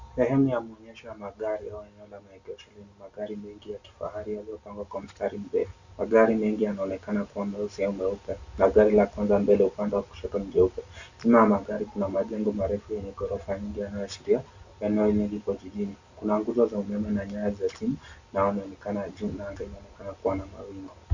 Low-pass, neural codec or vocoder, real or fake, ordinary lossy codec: 7.2 kHz; none; real; Opus, 64 kbps